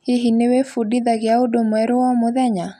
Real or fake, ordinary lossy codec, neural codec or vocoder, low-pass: real; none; none; 14.4 kHz